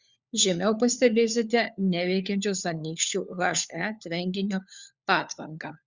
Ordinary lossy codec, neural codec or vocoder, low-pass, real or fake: Opus, 64 kbps; codec, 16 kHz, 4 kbps, FunCodec, trained on LibriTTS, 50 frames a second; 7.2 kHz; fake